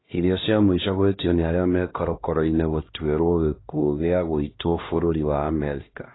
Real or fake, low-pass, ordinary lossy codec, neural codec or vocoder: fake; 7.2 kHz; AAC, 16 kbps; codec, 16 kHz, 1 kbps, X-Codec, HuBERT features, trained on LibriSpeech